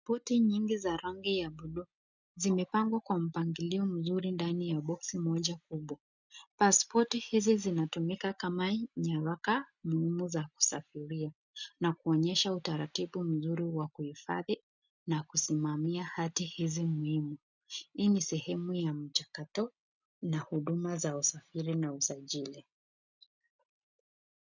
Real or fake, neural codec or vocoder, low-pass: real; none; 7.2 kHz